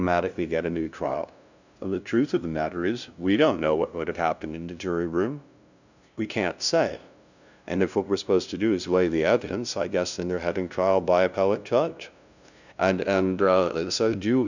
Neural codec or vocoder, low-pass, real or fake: codec, 16 kHz, 0.5 kbps, FunCodec, trained on LibriTTS, 25 frames a second; 7.2 kHz; fake